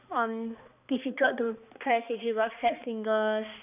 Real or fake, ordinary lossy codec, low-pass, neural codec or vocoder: fake; none; 3.6 kHz; codec, 16 kHz, 2 kbps, X-Codec, HuBERT features, trained on balanced general audio